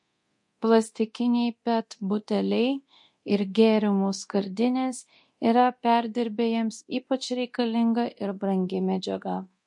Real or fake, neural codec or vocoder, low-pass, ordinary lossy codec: fake; codec, 24 kHz, 0.9 kbps, DualCodec; 10.8 kHz; MP3, 48 kbps